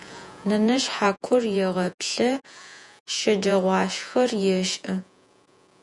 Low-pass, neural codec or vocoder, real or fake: 10.8 kHz; vocoder, 48 kHz, 128 mel bands, Vocos; fake